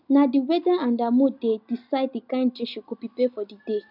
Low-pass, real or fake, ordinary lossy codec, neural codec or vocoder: 5.4 kHz; real; none; none